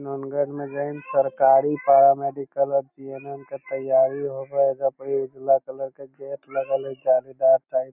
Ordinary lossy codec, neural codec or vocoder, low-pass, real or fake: none; none; 3.6 kHz; real